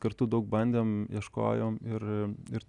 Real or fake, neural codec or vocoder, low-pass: real; none; 10.8 kHz